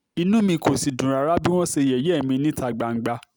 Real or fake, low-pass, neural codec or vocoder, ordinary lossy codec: real; none; none; none